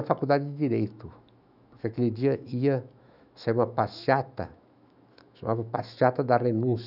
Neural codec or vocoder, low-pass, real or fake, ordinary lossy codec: autoencoder, 48 kHz, 128 numbers a frame, DAC-VAE, trained on Japanese speech; 5.4 kHz; fake; none